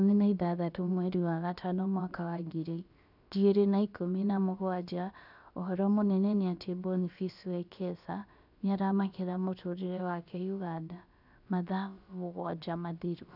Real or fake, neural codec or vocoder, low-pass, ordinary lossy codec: fake; codec, 16 kHz, about 1 kbps, DyCAST, with the encoder's durations; 5.4 kHz; none